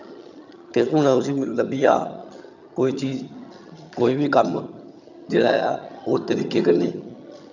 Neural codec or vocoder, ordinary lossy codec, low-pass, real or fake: vocoder, 22.05 kHz, 80 mel bands, HiFi-GAN; none; 7.2 kHz; fake